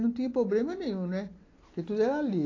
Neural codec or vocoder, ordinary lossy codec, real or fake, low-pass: none; AAC, 32 kbps; real; 7.2 kHz